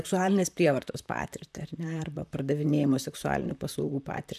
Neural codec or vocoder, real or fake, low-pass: vocoder, 44.1 kHz, 128 mel bands, Pupu-Vocoder; fake; 14.4 kHz